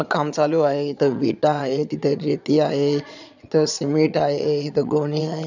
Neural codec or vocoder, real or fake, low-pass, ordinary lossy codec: vocoder, 22.05 kHz, 80 mel bands, Vocos; fake; 7.2 kHz; none